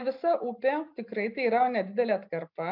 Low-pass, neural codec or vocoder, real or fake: 5.4 kHz; none; real